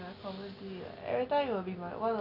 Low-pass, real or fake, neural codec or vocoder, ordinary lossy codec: 5.4 kHz; real; none; none